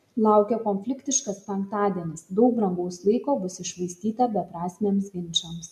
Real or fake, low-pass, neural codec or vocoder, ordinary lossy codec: real; 14.4 kHz; none; MP3, 96 kbps